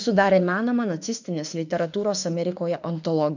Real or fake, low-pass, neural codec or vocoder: fake; 7.2 kHz; autoencoder, 48 kHz, 32 numbers a frame, DAC-VAE, trained on Japanese speech